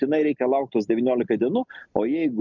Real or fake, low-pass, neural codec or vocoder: real; 7.2 kHz; none